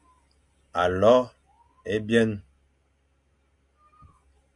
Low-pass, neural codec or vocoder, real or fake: 10.8 kHz; none; real